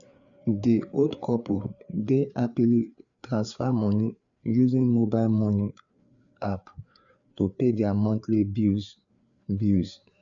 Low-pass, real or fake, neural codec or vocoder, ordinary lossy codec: 7.2 kHz; fake; codec, 16 kHz, 4 kbps, FreqCodec, larger model; AAC, 64 kbps